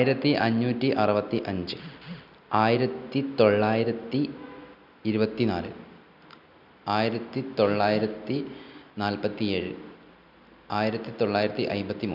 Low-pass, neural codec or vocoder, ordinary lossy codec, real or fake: 5.4 kHz; none; none; real